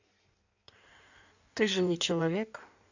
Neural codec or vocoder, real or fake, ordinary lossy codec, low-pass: codec, 16 kHz in and 24 kHz out, 1.1 kbps, FireRedTTS-2 codec; fake; none; 7.2 kHz